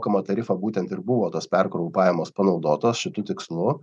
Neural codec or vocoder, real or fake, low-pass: none; real; 10.8 kHz